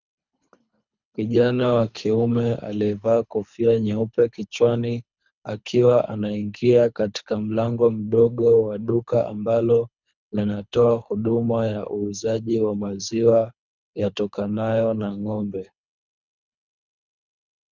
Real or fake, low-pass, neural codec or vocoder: fake; 7.2 kHz; codec, 24 kHz, 3 kbps, HILCodec